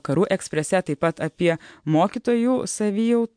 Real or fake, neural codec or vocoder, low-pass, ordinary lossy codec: real; none; 9.9 kHz; MP3, 64 kbps